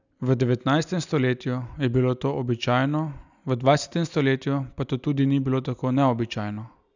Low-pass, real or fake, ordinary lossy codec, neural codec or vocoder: 7.2 kHz; real; none; none